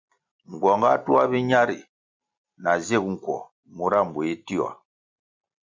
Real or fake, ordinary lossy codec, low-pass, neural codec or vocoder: real; MP3, 64 kbps; 7.2 kHz; none